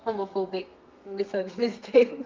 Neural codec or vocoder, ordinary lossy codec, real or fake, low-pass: codec, 32 kHz, 1.9 kbps, SNAC; Opus, 32 kbps; fake; 7.2 kHz